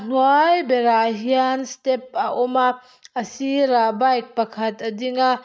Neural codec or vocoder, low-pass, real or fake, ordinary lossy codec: none; none; real; none